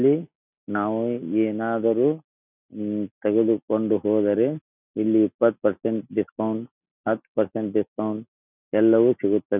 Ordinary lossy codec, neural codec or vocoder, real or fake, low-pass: none; none; real; 3.6 kHz